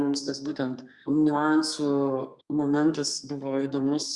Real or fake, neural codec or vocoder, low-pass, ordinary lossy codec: fake; codec, 32 kHz, 1.9 kbps, SNAC; 10.8 kHz; Opus, 64 kbps